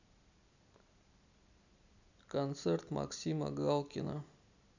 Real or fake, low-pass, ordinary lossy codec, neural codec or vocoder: real; 7.2 kHz; none; none